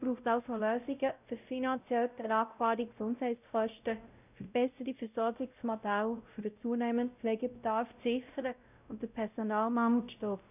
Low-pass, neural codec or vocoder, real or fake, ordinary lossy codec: 3.6 kHz; codec, 16 kHz, 0.5 kbps, X-Codec, WavLM features, trained on Multilingual LibriSpeech; fake; none